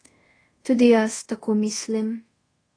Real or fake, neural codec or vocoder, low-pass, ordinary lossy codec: fake; codec, 24 kHz, 0.5 kbps, DualCodec; 9.9 kHz; AAC, 32 kbps